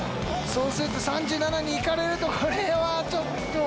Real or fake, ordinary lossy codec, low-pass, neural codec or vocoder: real; none; none; none